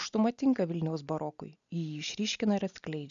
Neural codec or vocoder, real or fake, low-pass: none; real; 7.2 kHz